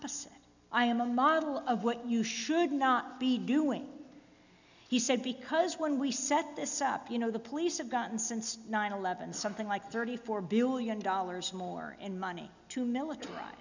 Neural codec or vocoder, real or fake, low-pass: none; real; 7.2 kHz